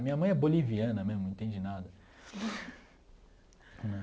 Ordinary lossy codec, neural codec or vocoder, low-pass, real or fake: none; none; none; real